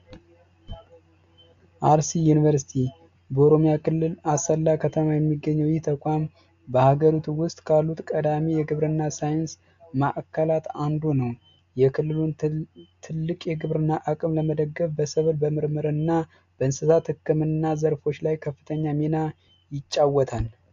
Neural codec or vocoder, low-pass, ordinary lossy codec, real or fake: none; 7.2 kHz; AAC, 64 kbps; real